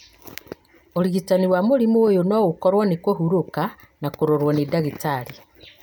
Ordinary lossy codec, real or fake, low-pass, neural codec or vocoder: none; real; none; none